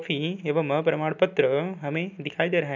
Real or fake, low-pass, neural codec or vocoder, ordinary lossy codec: real; 7.2 kHz; none; none